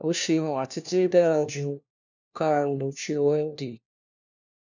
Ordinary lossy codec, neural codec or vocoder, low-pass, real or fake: none; codec, 16 kHz, 1 kbps, FunCodec, trained on LibriTTS, 50 frames a second; 7.2 kHz; fake